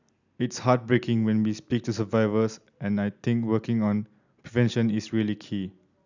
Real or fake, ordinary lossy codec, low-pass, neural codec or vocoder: real; none; 7.2 kHz; none